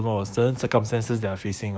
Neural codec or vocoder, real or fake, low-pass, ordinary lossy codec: codec, 16 kHz, 6 kbps, DAC; fake; none; none